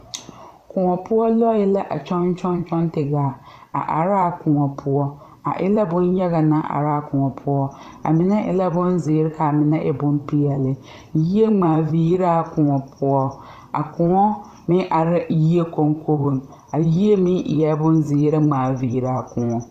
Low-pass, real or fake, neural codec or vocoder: 14.4 kHz; fake; vocoder, 44.1 kHz, 128 mel bands, Pupu-Vocoder